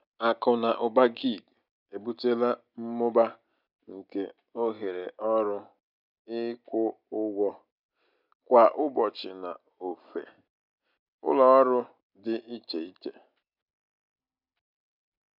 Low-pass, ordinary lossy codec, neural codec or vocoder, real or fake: 5.4 kHz; none; none; real